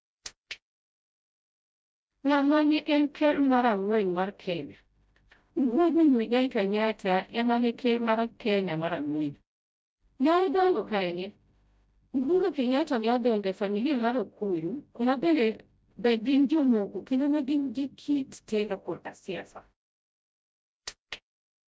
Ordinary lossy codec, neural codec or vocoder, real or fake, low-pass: none; codec, 16 kHz, 0.5 kbps, FreqCodec, smaller model; fake; none